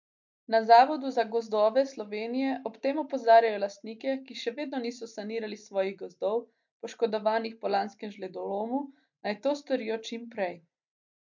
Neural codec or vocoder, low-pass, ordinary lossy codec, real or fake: none; 7.2 kHz; MP3, 64 kbps; real